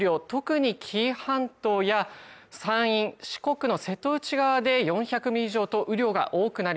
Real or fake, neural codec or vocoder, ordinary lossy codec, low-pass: real; none; none; none